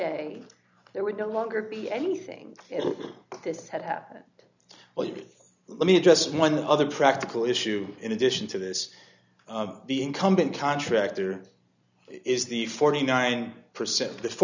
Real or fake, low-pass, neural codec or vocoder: real; 7.2 kHz; none